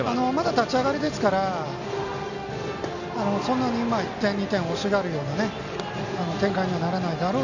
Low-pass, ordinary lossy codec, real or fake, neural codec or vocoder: 7.2 kHz; none; real; none